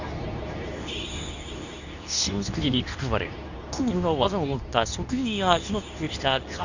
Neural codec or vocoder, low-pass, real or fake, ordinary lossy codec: codec, 24 kHz, 0.9 kbps, WavTokenizer, medium speech release version 2; 7.2 kHz; fake; none